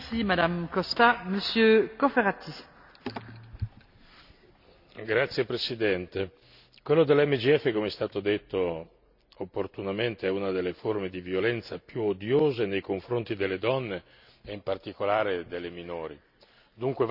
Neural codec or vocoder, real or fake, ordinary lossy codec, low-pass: none; real; none; 5.4 kHz